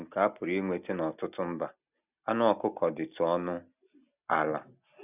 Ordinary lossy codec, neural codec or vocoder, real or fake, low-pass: none; none; real; 3.6 kHz